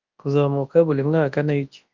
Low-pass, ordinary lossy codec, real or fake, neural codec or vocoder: 7.2 kHz; Opus, 24 kbps; fake; codec, 24 kHz, 0.9 kbps, WavTokenizer, large speech release